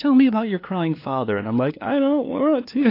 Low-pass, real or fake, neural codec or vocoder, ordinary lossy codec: 5.4 kHz; fake; codec, 16 kHz, 2 kbps, FunCodec, trained on LibriTTS, 25 frames a second; AAC, 32 kbps